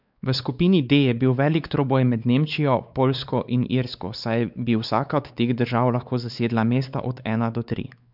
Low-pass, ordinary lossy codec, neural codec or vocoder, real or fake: 5.4 kHz; none; codec, 16 kHz, 4 kbps, X-Codec, WavLM features, trained on Multilingual LibriSpeech; fake